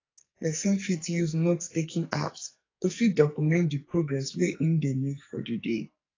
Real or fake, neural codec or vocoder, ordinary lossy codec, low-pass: fake; codec, 44.1 kHz, 2.6 kbps, SNAC; AAC, 32 kbps; 7.2 kHz